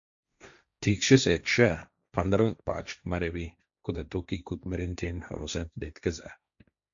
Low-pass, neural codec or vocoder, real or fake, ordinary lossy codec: 7.2 kHz; codec, 16 kHz, 1.1 kbps, Voila-Tokenizer; fake; MP3, 64 kbps